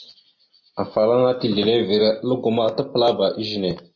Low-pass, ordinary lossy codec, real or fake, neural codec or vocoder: 7.2 kHz; MP3, 32 kbps; real; none